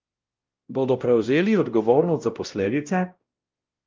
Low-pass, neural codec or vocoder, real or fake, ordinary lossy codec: 7.2 kHz; codec, 16 kHz, 0.5 kbps, X-Codec, WavLM features, trained on Multilingual LibriSpeech; fake; Opus, 32 kbps